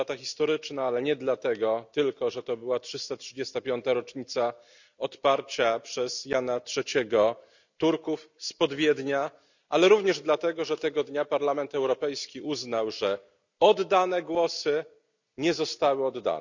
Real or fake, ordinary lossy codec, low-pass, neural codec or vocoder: real; none; 7.2 kHz; none